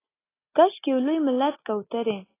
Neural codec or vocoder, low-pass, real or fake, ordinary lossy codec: none; 3.6 kHz; real; AAC, 16 kbps